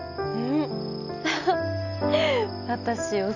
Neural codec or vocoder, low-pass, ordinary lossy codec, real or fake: none; 7.2 kHz; none; real